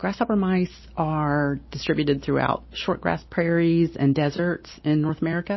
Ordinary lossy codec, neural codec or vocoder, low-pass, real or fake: MP3, 24 kbps; none; 7.2 kHz; real